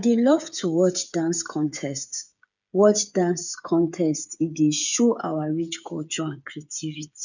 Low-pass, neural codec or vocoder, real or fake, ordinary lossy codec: 7.2 kHz; codec, 16 kHz, 8 kbps, FreqCodec, smaller model; fake; none